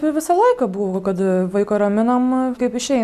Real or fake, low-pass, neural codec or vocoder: real; 14.4 kHz; none